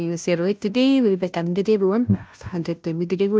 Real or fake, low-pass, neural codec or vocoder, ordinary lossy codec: fake; none; codec, 16 kHz, 0.5 kbps, FunCodec, trained on Chinese and English, 25 frames a second; none